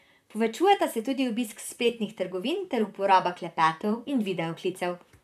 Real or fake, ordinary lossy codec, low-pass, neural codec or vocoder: fake; none; 14.4 kHz; vocoder, 44.1 kHz, 128 mel bands, Pupu-Vocoder